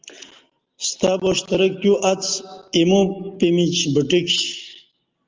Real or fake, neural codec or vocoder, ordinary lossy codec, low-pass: real; none; Opus, 32 kbps; 7.2 kHz